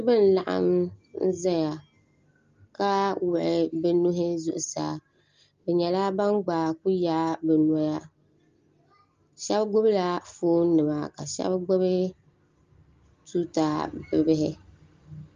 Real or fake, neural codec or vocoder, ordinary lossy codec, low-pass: real; none; Opus, 32 kbps; 7.2 kHz